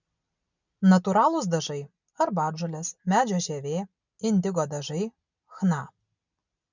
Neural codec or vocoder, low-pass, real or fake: none; 7.2 kHz; real